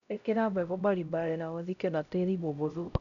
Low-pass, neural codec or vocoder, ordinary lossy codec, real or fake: 7.2 kHz; codec, 16 kHz, 0.5 kbps, X-Codec, HuBERT features, trained on LibriSpeech; none; fake